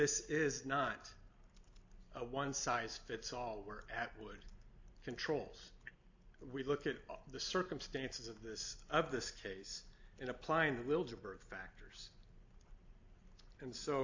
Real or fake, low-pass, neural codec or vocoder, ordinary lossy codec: real; 7.2 kHz; none; AAC, 48 kbps